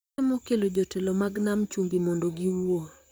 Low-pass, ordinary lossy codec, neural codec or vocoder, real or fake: none; none; vocoder, 44.1 kHz, 128 mel bands, Pupu-Vocoder; fake